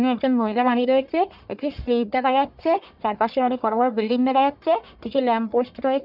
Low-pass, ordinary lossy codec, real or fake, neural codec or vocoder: 5.4 kHz; none; fake; codec, 44.1 kHz, 1.7 kbps, Pupu-Codec